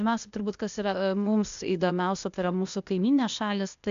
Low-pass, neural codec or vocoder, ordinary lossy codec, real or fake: 7.2 kHz; codec, 16 kHz, 0.8 kbps, ZipCodec; MP3, 64 kbps; fake